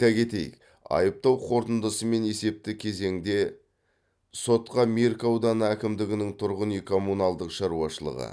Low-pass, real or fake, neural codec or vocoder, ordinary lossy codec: none; real; none; none